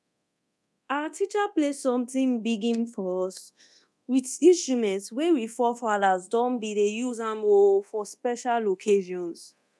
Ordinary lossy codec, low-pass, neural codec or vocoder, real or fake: none; none; codec, 24 kHz, 0.9 kbps, DualCodec; fake